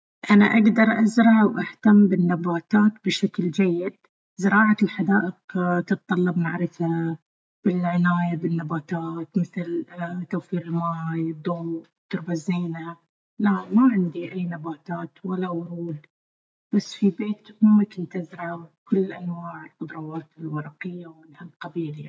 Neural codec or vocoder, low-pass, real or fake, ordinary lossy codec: none; none; real; none